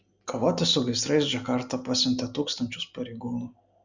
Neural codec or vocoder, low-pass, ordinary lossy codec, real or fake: none; 7.2 kHz; Opus, 64 kbps; real